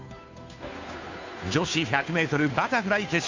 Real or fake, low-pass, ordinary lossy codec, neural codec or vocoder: fake; 7.2 kHz; AAC, 48 kbps; codec, 16 kHz, 2 kbps, FunCodec, trained on Chinese and English, 25 frames a second